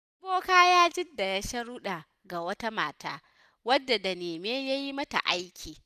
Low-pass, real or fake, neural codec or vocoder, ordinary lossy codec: 14.4 kHz; real; none; none